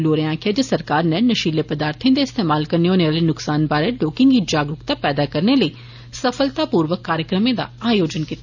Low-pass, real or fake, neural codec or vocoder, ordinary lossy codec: 7.2 kHz; real; none; none